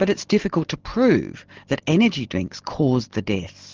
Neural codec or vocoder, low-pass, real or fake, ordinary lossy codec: none; 7.2 kHz; real; Opus, 16 kbps